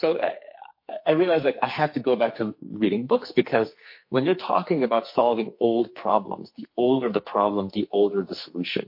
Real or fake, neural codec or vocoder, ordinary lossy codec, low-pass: fake; codec, 44.1 kHz, 2.6 kbps, SNAC; MP3, 32 kbps; 5.4 kHz